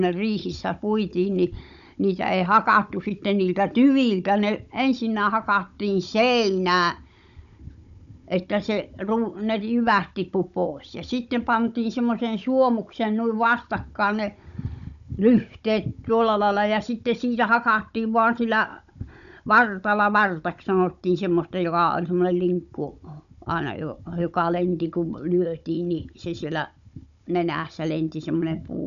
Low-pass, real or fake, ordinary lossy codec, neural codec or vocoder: 7.2 kHz; fake; none; codec, 16 kHz, 16 kbps, FunCodec, trained on Chinese and English, 50 frames a second